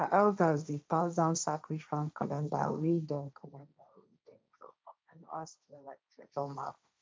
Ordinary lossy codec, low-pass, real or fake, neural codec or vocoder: none; none; fake; codec, 16 kHz, 1.1 kbps, Voila-Tokenizer